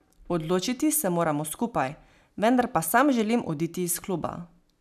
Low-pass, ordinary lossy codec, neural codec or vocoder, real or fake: 14.4 kHz; none; none; real